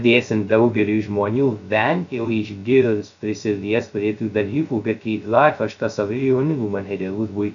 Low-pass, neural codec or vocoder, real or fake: 7.2 kHz; codec, 16 kHz, 0.2 kbps, FocalCodec; fake